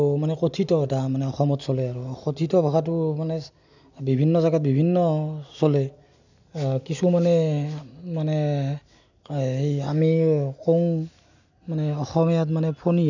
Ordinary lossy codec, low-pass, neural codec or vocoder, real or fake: none; 7.2 kHz; none; real